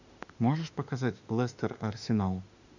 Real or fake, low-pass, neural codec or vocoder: fake; 7.2 kHz; autoencoder, 48 kHz, 32 numbers a frame, DAC-VAE, trained on Japanese speech